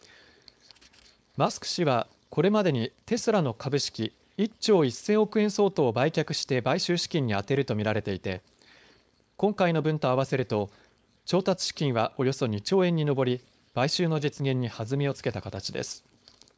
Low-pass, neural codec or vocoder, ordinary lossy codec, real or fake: none; codec, 16 kHz, 4.8 kbps, FACodec; none; fake